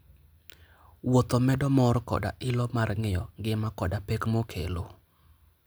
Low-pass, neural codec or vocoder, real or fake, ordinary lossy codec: none; none; real; none